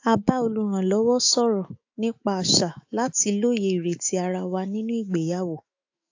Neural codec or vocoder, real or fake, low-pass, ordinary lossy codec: autoencoder, 48 kHz, 128 numbers a frame, DAC-VAE, trained on Japanese speech; fake; 7.2 kHz; AAC, 48 kbps